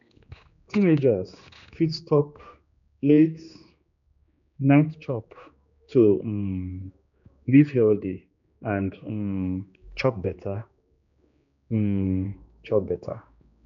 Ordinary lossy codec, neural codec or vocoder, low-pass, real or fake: none; codec, 16 kHz, 2 kbps, X-Codec, HuBERT features, trained on general audio; 7.2 kHz; fake